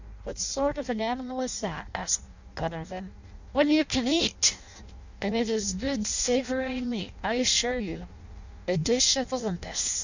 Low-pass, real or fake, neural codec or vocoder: 7.2 kHz; fake; codec, 16 kHz in and 24 kHz out, 0.6 kbps, FireRedTTS-2 codec